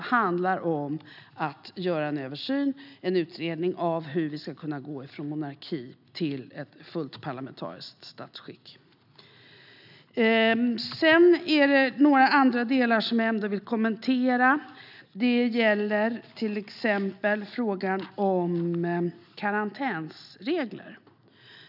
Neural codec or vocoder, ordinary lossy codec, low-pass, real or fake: none; none; 5.4 kHz; real